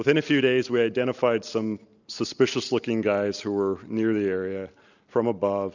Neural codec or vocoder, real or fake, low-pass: none; real; 7.2 kHz